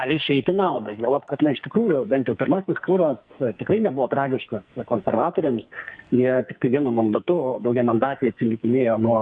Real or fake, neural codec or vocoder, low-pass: fake; codec, 44.1 kHz, 2.6 kbps, SNAC; 9.9 kHz